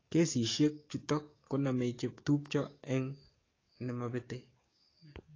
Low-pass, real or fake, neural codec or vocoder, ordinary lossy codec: 7.2 kHz; fake; codec, 44.1 kHz, 7.8 kbps, DAC; AAC, 32 kbps